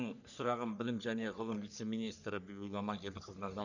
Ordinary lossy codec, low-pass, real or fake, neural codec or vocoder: none; 7.2 kHz; fake; codec, 44.1 kHz, 3.4 kbps, Pupu-Codec